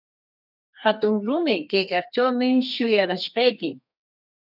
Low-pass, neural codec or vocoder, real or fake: 5.4 kHz; codec, 32 kHz, 1.9 kbps, SNAC; fake